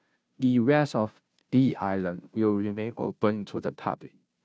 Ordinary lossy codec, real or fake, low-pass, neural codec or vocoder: none; fake; none; codec, 16 kHz, 0.5 kbps, FunCodec, trained on Chinese and English, 25 frames a second